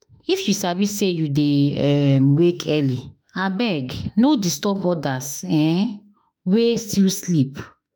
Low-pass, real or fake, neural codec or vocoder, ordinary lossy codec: none; fake; autoencoder, 48 kHz, 32 numbers a frame, DAC-VAE, trained on Japanese speech; none